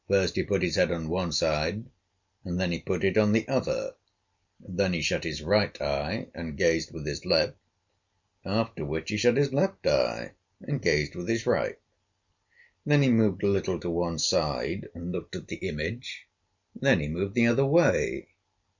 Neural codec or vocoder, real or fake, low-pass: none; real; 7.2 kHz